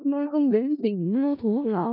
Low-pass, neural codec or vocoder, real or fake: 5.4 kHz; codec, 16 kHz in and 24 kHz out, 0.4 kbps, LongCat-Audio-Codec, four codebook decoder; fake